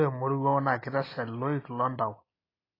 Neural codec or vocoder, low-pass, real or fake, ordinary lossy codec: none; 5.4 kHz; real; AAC, 24 kbps